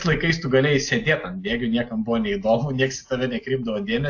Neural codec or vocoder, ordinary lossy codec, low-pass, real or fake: none; AAC, 48 kbps; 7.2 kHz; real